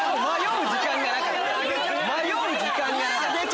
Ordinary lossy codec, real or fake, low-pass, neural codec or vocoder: none; real; none; none